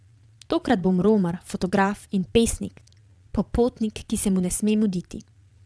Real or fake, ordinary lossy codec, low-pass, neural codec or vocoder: fake; none; none; vocoder, 22.05 kHz, 80 mel bands, Vocos